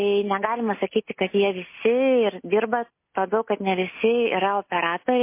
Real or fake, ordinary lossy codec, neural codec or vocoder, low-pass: real; MP3, 24 kbps; none; 3.6 kHz